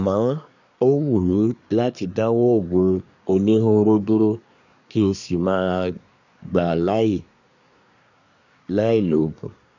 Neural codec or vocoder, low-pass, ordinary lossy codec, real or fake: codec, 24 kHz, 1 kbps, SNAC; 7.2 kHz; none; fake